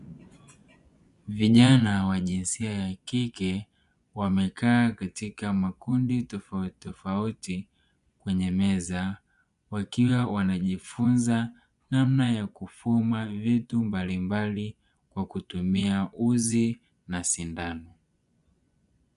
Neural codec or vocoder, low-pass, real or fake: vocoder, 24 kHz, 100 mel bands, Vocos; 10.8 kHz; fake